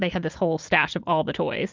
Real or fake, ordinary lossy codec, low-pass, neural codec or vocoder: real; Opus, 24 kbps; 7.2 kHz; none